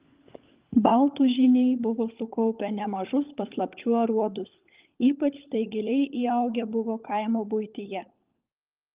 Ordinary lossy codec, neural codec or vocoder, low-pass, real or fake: Opus, 32 kbps; codec, 16 kHz, 16 kbps, FunCodec, trained on LibriTTS, 50 frames a second; 3.6 kHz; fake